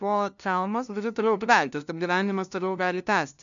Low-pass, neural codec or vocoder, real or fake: 7.2 kHz; codec, 16 kHz, 0.5 kbps, FunCodec, trained on LibriTTS, 25 frames a second; fake